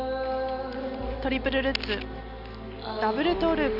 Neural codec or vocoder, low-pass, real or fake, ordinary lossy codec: none; 5.4 kHz; real; none